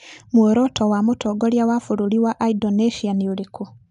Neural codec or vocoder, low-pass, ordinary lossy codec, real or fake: none; 10.8 kHz; none; real